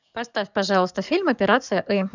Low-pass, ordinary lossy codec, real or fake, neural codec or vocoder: 7.2 kHz; none; fake; codec, 44.1 kHz, 7.8 kbps, DAC